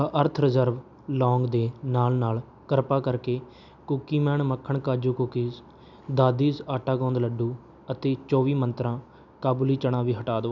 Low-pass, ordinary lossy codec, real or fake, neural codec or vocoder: 7.2 kHz; none; real; none